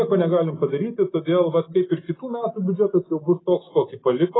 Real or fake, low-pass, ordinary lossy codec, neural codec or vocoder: real; 7.2 kHz; AAC, 16 kbps; none